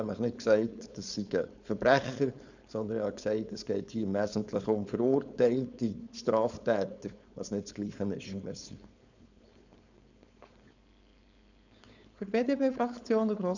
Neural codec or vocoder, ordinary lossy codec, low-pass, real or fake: codec, 16 kHz, 4.8 kbps, FACodec; none; 7.2 kHz; fake